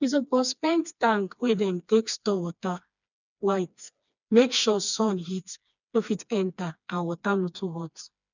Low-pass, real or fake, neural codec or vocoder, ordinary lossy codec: 7.2 kHz; fake; codec, 16 kHz, 2 kbps, FreqCodec, smaller model; none